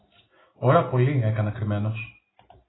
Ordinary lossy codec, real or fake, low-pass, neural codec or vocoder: AAC, 16 kbps; real; 7.2 kHz; none